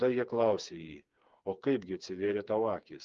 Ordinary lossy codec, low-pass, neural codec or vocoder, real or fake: Opus, 32 kbps; 7.2 kHz; codec, 16 kHz, 4 kbps, FreqCodec, smaller model; fake